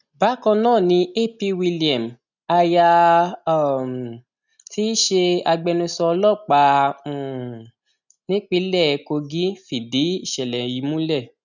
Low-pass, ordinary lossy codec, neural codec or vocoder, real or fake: 7.2 kHz; none; none; real